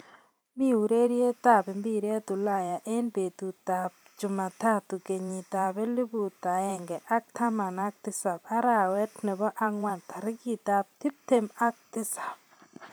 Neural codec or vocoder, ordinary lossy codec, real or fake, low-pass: vocoder, 44.1 kHz, 128 mel bands every 512 samples, BigVGAN v2; none; fake; none